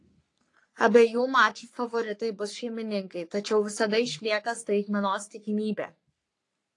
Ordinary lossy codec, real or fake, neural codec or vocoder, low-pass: AAC, 48 kbps; fake; codec, 44.1 kHz, 3.4 kbps, Pupu-Codec; 10.8 kHz